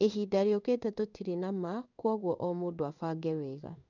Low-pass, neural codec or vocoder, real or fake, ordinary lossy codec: 7.2 kHz; codec, 16 kHz in and 24 kHz out, 1 kbps, XY-Tokenizer; fake; none